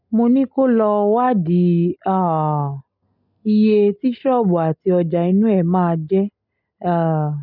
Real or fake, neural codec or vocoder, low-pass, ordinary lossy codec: real; none; 5.4 kHz; none